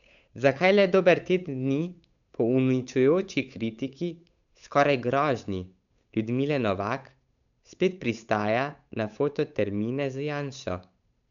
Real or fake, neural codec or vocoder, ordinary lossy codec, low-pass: fake; codec, 16 kHz, 8 kbps, FunCodec, trained on Chinese and English, 25 frames a second; none; 7.2 kHz